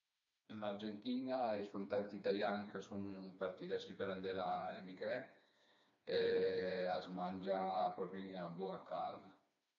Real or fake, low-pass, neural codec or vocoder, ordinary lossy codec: fake; 7.2 kHz; codec, 16 kHz, 2 kbps, FreqCodec, smaller model; AAC, 64 kbps